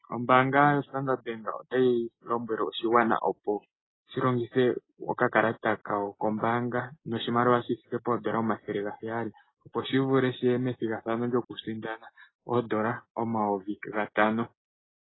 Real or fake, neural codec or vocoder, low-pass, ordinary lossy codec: real; none; 7.2 kHz; AAC, 16 kbps